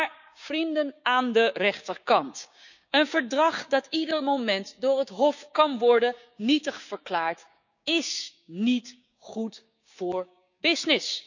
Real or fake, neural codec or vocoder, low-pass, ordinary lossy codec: fake; codec, 16 kHz, 6 kbps, DAC; 7.2 kHz; none